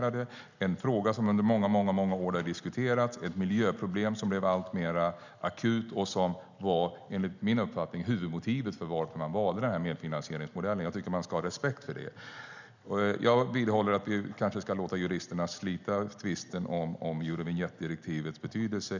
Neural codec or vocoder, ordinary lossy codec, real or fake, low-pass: none; none; real; 7.2 kHz